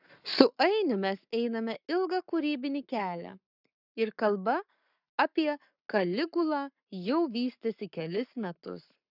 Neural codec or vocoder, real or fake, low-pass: codec, 44.1 kHz, 7.8 kbps, Pupu-Codec; fake; 5.4 kHz